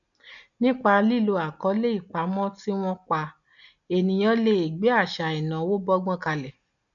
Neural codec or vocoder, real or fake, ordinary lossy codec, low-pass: none; real; none; 7.2 kHz